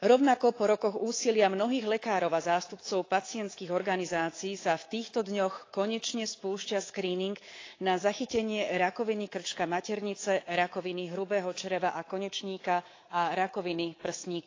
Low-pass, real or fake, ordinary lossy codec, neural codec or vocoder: 7.2 kHz; fake; AAC, 32 kbps; codec, 24 kHz, 3.1 kbps, DualCodec